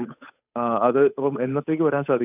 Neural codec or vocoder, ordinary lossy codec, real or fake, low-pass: codec, 16 kHz, 8 kbps, FunCodec, trained on Chinese and English, 25 frames a second; none; fake; 3.6 kHz